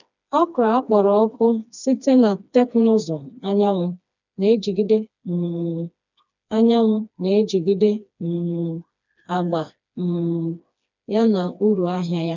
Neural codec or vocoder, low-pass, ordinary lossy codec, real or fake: codec, 16 kHz, 2 kbps, FreqCodec, smaller model; 7.2 kHz; none; fake